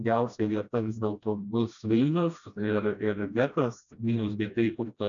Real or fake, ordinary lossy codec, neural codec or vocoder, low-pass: fake; MP3, 96 kbps; codec, 16 kHz, 1 kbps, FreqCodec, smaller model; 7.2 kHz